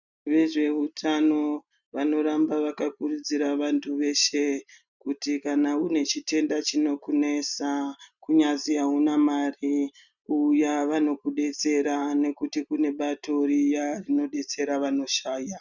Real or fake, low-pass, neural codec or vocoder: real; 7.2 kHz; none